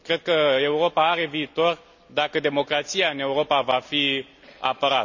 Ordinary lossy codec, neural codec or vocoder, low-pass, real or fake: none; none; 7.2 kHz; real